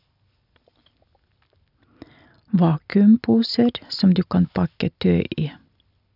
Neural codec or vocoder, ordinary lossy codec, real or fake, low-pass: none; none; real; 5.4 kHz